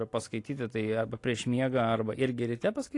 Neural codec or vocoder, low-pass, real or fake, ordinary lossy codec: none; 10.8 kHz; real; AAC, 48 kbps